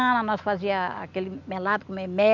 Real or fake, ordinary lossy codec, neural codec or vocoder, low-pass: real; none; none; 7.2 kHz